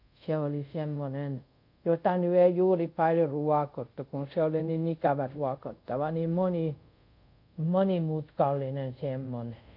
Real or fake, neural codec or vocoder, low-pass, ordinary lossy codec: fake; codec, 24 kHz, 0.5 kbps, DualCodec; 5.4 kHz; none